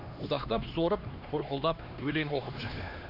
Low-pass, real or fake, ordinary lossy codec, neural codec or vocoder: 5.4 kHz; fake; none; codec, 16 kHz, 2 kbps, X-Codec, HuBERT features, trained on LibriSpeech